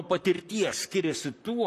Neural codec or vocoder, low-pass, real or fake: vocoder, 44.1 kHz, 128 mel bands, Pupu-Vocoder; 14.4 kHz; fake